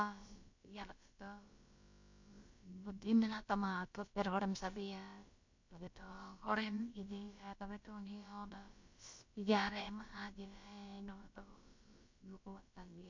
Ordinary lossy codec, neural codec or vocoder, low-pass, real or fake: MP3, 48 kbps; codec, 16 kHz, about 1 kbps, DyCAST, with the encoder's durations; 7.2 kHz; fake